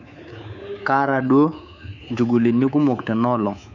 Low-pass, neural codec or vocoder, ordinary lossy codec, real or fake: 7.2 kHz; codec, 24 kHz, 3.1 kbps, DualCodec; none; fake